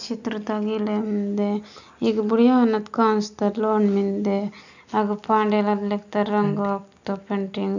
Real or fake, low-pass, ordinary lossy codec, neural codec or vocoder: real; 7.2 kHz; none; none